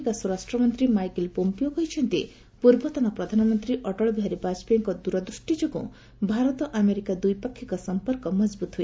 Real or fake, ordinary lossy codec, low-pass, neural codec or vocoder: real; none; none; none